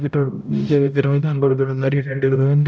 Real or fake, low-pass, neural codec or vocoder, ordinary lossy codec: fake; none; codec, 16 kHz, 0.5 kbps, X-Codec, HuBERT features, trained on balanced general audio; none